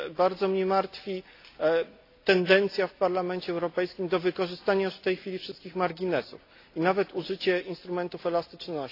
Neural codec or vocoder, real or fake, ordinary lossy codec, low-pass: none; real; AAC, 32 kbps; 5.4 kHz